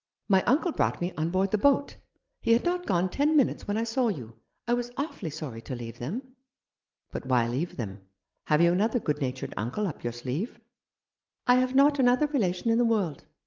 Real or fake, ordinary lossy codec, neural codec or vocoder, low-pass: real; Opus, 32 kbps; none; 7.2 kHz